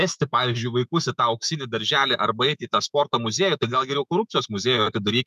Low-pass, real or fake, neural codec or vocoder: 14.4 kHz; fake; autoencoder, 48 kHz, 128 numbers a frame, DAC-VAE, trained on Japanese speech